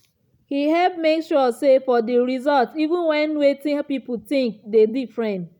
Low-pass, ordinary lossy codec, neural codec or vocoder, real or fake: 19.8 kHz; none; none; real